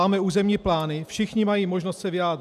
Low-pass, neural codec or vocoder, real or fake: 14.4 kHz; none; real